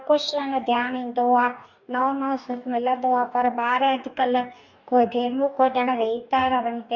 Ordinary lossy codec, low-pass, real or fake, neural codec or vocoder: none; 7.2 kHz; fake; codec, 44.1 kHz, 2.6 kbps, DAC